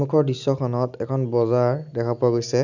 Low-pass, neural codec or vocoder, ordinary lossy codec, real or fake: 7.2 kHz; none; none; real